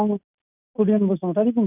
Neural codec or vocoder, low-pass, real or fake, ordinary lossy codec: none; 3.6 kHz; real; none